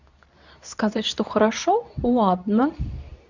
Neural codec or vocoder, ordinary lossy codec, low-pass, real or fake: codec, 24 kHz, 0.9 kbps, WavTokenizer, medium speech release version 2; none; 7.2 kHz; fake